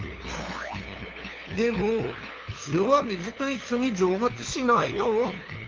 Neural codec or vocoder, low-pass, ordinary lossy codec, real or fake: codec, 16 kHz, 2 kbps, FunCodec, trained on LibriTTS, 25 frames a second; 7.2 kHz; Opus, 32 kbps; fake